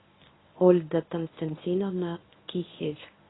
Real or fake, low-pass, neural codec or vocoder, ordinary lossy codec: fake; 7.2 kHz; codec, 24 kHz, 0.9 kbps, WavTokenizer, medium speech release version 1; AAC, 16 kbps